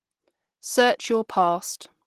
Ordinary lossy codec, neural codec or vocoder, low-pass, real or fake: Opus, 16 kbps; none; 14.4 kHz; real